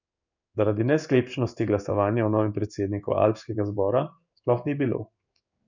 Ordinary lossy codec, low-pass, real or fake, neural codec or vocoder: none; 7.2 kHz; fake; codec, 16 kHz in and 24 kHz out, 1 kbps, XY-Tokenizer